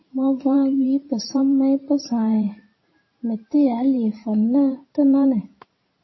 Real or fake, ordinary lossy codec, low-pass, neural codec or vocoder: fake; MP3, 24 kbps; 7.2 kHz; vocoder, 44.1 kHz, 128 mel bands every 512 samples, BigVGAN v2